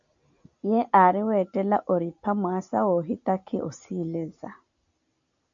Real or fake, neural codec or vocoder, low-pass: real; none; 7.2 kHz